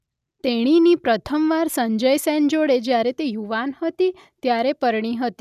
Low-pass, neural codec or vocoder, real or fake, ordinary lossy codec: 14.4 kHz; none; real; none